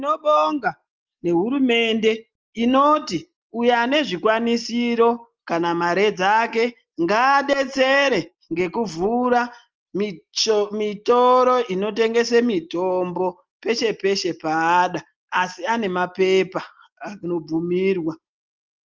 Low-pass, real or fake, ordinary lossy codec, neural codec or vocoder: 7.2 kHz; real; Opus, 24 kbps; none